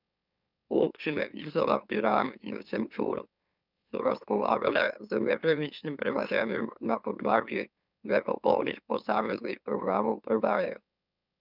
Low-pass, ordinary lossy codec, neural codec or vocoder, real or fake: 5.4 kHz; none; autoencoder, 44.1 kHz, a latent of 192 numbers a frame, MeloTTS; fake